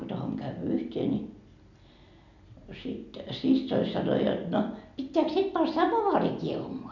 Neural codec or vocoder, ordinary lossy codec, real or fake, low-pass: none; none; real; 7.2 kHz